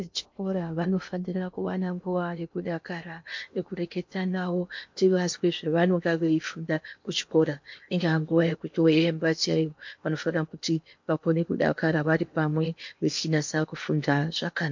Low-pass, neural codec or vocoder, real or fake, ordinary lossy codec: 7.2 kHz; codec, 16 kHz in and 24 kHz out, 0.8 kbps, FocalCodec, streaming, 65536 codes; fake; MP3, 48 kbps